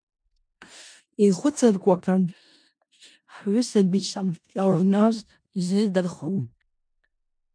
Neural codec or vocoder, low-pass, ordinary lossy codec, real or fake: codec, 16 kHz in and 24 kHz out, 0.4 kbps, LongCat-Audio-Codec, four codebook decoder; 9.9 kHz; MP3, 96 kbps; fake